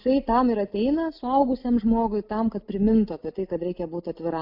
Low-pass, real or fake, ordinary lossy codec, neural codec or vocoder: 5.4 kHz; real; AAC, 48 kbps; none